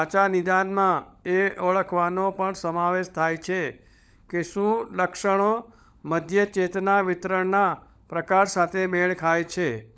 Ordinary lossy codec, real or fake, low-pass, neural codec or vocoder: none; fake; none; codec, 16 kHz, 16 kbps, FunCodec, trained on LibriTTS, 50 frames a second